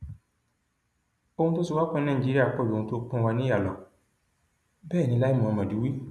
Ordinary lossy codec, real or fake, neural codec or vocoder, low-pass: none; real; none; none